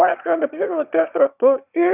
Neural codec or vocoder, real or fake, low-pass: autoencoder, 22.05 kHz, a latent of 192 numbers a frame, VITS, trained on one speaker; fake; 3.6 kHz